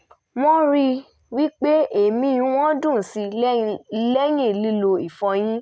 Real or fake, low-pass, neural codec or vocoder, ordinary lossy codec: real; none; none; none